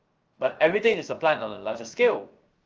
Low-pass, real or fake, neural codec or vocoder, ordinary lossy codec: 7.2 kHz; fake; codec, 16 kHz, 0.7 kbps, FocalCodec; Opus, 24 kbps